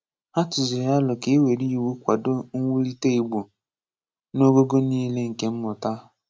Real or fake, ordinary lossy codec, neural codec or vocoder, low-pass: real; none; none; none